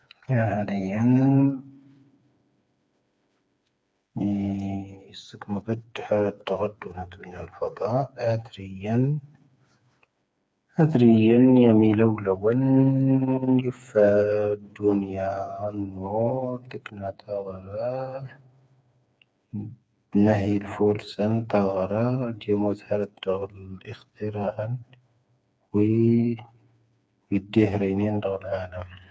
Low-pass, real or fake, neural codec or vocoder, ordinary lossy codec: none; fake; codec, 16 kHz, 4 kbps, FreqCodec, smaller model; none